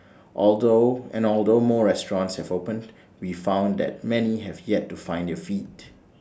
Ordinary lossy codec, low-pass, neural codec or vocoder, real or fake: none; none; none; real